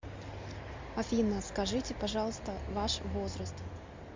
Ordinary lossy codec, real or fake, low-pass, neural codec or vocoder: MP3, 48 kbps; real; 7.2 kHz; none